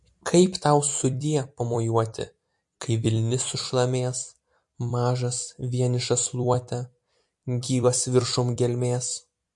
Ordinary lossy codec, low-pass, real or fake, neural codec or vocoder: MP3, 48 kbps; 10.8 kHz; fake; vocoder, 44.1 kHz, 128 mel bands every 256 samples, BigVGAN v2